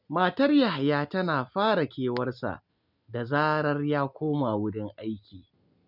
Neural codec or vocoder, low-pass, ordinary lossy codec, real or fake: none; 5.4 kHz; none; real